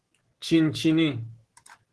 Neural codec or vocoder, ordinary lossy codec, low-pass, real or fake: autoencoder, 48 kHz, 128 numbers a frame, DAC-VAE, trained on Japanese speech; Opus, 16 kbps; 10.8 kHz; fake